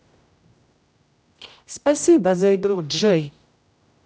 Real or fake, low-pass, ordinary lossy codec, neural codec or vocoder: fake; none; none; codec, 16 kHz, 0.5 kbps, X-Codec, HuBERT features, trained on general audio